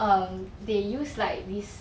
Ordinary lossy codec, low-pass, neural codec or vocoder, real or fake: none; none; none; real